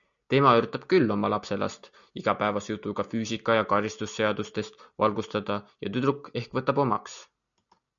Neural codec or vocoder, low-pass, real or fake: none; 7.2 kHz; real